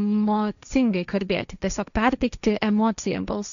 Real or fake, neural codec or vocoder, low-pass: fake; codec, 16 kHz, 1.1 kbps, Voila-Tokenizer; 7.2 kHz